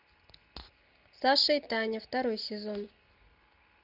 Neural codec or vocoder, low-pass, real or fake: none; 5.4 kHz; real